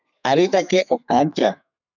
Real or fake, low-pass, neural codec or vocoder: fake; 7.2 kHz; codec, 32 kHz, 1.9 kbps, SNAC